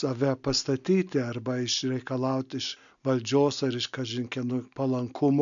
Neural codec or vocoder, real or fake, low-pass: none; real; 7.2 kHz